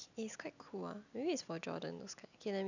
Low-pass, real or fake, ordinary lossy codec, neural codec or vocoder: 7.2 kHz; real; none; none